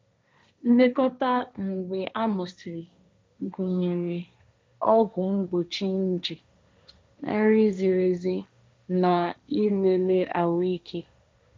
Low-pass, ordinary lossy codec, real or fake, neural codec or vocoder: none; none; fake; codec, 16 kHz, 1.1 kbps, Voila-Tokenizer